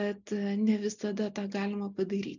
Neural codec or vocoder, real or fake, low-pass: none; real; 7.2 kHz